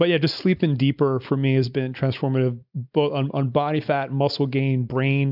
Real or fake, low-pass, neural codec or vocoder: real; 5.4 kHz; none